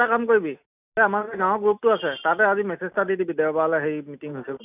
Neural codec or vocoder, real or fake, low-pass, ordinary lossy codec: none; real; 3.6 kHz; none